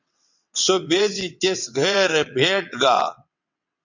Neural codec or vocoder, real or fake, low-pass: vocoder, 22.05 kHz, 80 mel bands, WaveNeXt; fake; 7.2 kHz